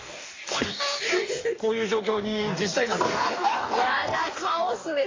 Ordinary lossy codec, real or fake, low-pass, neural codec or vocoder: AAC, 32 kbps; fake; 7.2 kHz; codec, 44.1 kHz, 2.6 kbps, DAC